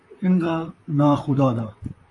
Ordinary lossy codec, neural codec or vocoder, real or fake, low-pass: AAC, 48 kbps; vocoder, 44.1 kHz, 128 mel bands, Pupu-Vocoder; fake; 10.8 kHz